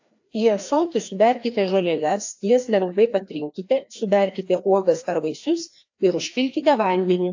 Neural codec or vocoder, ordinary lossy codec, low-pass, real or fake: codec, 16 kHz, 1 kbps, FreqCodec, larger model; AAC, 48 kbps; 7.2 kHz; fake